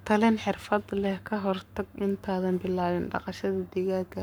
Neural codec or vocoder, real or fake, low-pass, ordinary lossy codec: codec, 44.1 kHz, 7.8 kbps, Pupu-Codec; fake; none; none